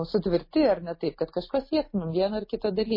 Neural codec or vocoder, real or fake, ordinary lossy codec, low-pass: none; real; MP3, 24 kbps; 5.4 kHz